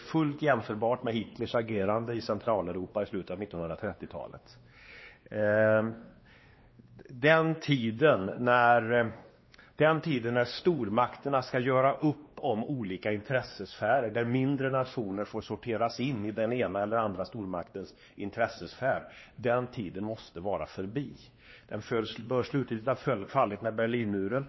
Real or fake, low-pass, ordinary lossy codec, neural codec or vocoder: fake; 7.2 kHz; MP3, 24 kbps; codec, 16 kHz, 2 kbps, X-Codec, WavLM features, trained on Multilingual LibriSpeech